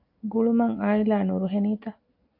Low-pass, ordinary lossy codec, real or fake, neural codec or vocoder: 5.4 kHz; AAC, 48 kbps; real; none